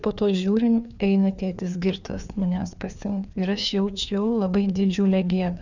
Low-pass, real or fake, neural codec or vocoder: 7.2 kHz; fake; codec, 16 kHz, 2 kbps, FreqCodec, larger model